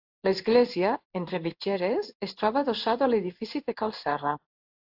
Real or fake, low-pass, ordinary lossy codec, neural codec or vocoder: fake; 5.4 kHz; AAC, 48 kbps; codec, 16 kHz in and 24 kHz out, 1 kbps, XY-Tokenizer